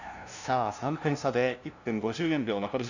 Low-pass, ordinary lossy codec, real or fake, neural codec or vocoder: 7.2 kHz; none; fake; codec, 16 kHz, 0.5 kbps, FunCodec, trained on LibriTTS, 25 frames a second